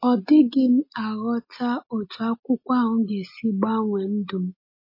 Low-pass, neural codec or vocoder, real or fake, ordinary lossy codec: 5.4 kHz; none; real; MP3, 24 kbps